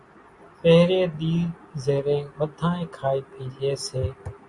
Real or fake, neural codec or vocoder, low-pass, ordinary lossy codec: real; none; 10.8 kHz; Opus, 64 kbps